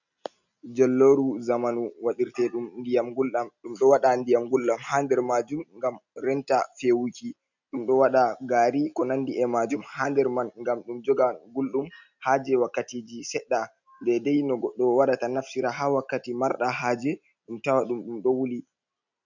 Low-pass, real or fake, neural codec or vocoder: 7.2 kHz; real; none